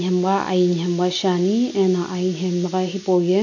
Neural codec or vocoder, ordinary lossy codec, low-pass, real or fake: none; none; 7.2 kHz; real